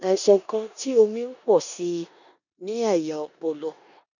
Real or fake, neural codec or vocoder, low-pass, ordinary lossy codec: fake; codec, 16 kHz in and 24 kHz out, 0.9 kbps, LongCat-Audio-Codec, four codebook decoder; 7.2 kHz; none